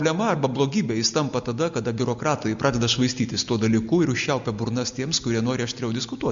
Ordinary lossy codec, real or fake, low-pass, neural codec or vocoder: MP3, 64 kbps; real; 7.2 kHz; none